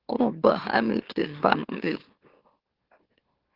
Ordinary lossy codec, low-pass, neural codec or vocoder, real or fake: Opus, 16 kbps; 5.4 kHz; autoencoder, 44.1 kHz, a latent of 192 numbers a frame, MeloTTS; fake